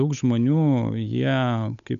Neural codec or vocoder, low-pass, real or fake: codec, 16 kHz, 8 kbps, FunCodec, trained on Chinese and English, 25 frames a second; 7.2 kHz; fake